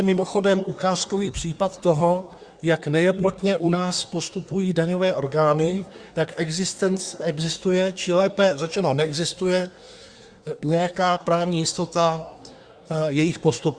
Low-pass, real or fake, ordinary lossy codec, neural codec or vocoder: 9.9 kHz; fake; Opus, 64 kbps; codec, 24 kHz, 1 kbps, SNAC